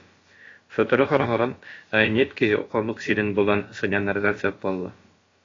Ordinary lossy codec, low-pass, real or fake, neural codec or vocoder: AAC, 32 kbps; 7.2 kHz; fake; codec, 16 kHz, about 1 kbps, DyCAST, with the encoder's durations